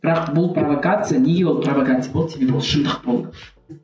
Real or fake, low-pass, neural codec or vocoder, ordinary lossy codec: real; none; none; none